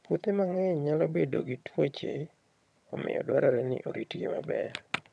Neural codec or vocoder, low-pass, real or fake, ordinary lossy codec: vocoder, 22.05 kHz, 80 mel bands, HiFi-GAN; none; fake; none